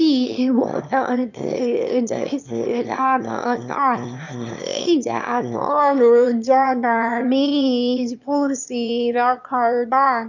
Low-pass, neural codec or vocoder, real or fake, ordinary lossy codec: 7.2 kHz; autoencoder, 22.05 kHz, a latent of 192 numbers a frame, VITS, trained on one speaker; fake; none